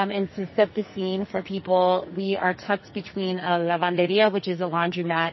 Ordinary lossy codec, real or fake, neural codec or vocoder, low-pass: MP3, 24 kbps; fake; codec, 44.1 kHz, 2.6 kbps, SNAC; 7.2 kHz